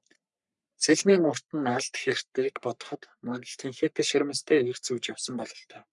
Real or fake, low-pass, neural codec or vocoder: fake; 10.8 kHz; codec, 44.1 kHz, 3.4 kbps, Pupu-Codec